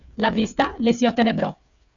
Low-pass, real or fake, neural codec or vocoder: 7.2 kHz; fake; codec, 16 kHz, 8 kbps, FreqCodec, smaller model